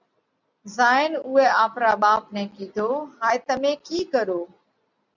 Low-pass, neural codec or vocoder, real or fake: 7.2 kHz; none; real